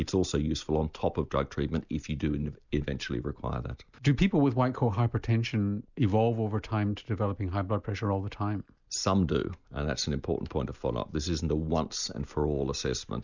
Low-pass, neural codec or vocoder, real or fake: 7.2 kHz; none; real